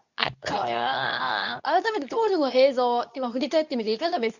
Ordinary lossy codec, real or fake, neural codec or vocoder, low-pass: none; fake; codec, 24 kHz, 0.9 kbps, WavTokenizer, medium speech release version 2; 7.2 kHz